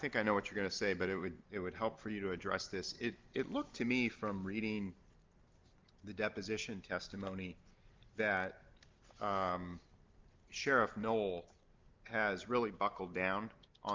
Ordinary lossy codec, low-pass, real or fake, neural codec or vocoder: Opus, 32 kbps; 7.2 kHz; real; none